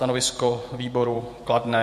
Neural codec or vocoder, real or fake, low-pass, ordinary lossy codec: vocoder, 48 kHz, 128 mel bands, Vocos; fake; 14.4 kHz; MP3, 64 kbps